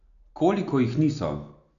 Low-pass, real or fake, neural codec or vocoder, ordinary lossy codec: 7.2 kHz; real; none; none